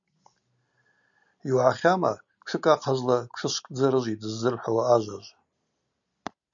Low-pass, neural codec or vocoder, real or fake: 7.2 kHz; none; real